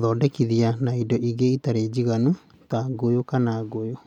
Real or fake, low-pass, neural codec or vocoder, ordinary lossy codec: real; 19.8 kHz; none; none